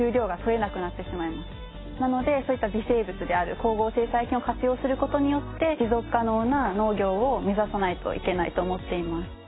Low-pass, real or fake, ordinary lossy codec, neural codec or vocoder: 7.2 kHz; real; AAC, 16 kbps; none